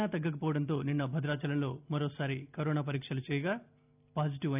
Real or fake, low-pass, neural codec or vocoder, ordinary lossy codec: real; 3.6 kHz; none; none